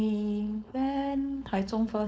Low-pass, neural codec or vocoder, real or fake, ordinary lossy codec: none; codec, 16 kHz, 4.8 kbps, FACodec; fake; none